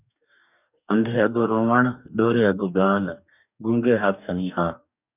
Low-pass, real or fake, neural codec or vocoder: 3.6 kHz; fake; codec, 44.1 kHz, 2.6 kbps, DAC